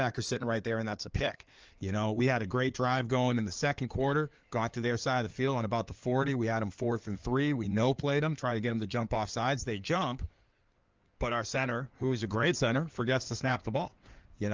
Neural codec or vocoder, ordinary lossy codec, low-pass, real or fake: codec, 16 kHz in and 24 kHz out, 2.2 kbps, FireRedTTS-2 codec; Opus, 24 kbps; 7.2 kHz; fake